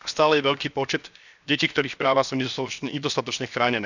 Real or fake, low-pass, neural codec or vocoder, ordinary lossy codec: fake; 7.2 kHz; codec, 16 kHz, 0.7 kbps, FocalCodec; none